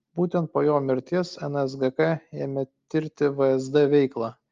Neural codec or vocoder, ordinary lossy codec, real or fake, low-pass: none; Opus, 32 kbps; real; 7.2 kHz